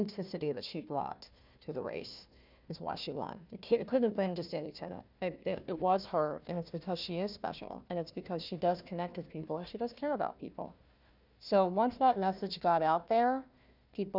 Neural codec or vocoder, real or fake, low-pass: codec, 16 kHz, 1 kbps, FunCodec, trained on Chinese and English, 50 frames a second; fake; 5.4 kHz